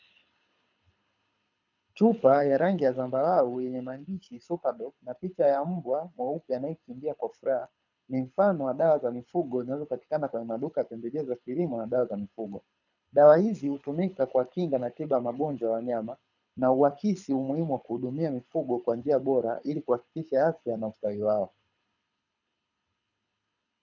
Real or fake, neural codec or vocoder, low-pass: fake; codec, 24 kHz, 6 kbps, HILCodec; 7.2 kHz